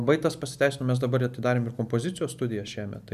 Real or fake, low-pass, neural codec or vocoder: real; 14.4 kHz; none